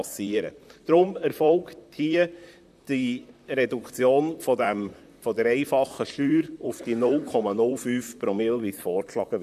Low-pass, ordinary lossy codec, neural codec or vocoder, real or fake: 14.4 kHz; none; vocoder, 44.1 kHz, 128 mel bands, Pupu-Vocoder; fake